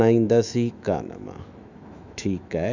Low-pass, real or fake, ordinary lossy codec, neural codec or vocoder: 7.2 kHz; fake; none; autoencoder, 48 kHz, 128 numbers a frame, DAC-VAE, trained on Japanese speech